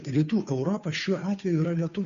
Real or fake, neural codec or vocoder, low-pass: fake; codec, 16 kHz, 2 kbps, FunCodec, trained on Chinese and English, 25 frames a second; 7.2 kHz